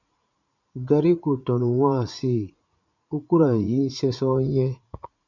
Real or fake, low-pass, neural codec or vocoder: fake; 7.2 kHz; vocoder, 44.1 kHz, 80 mel bands, Vocos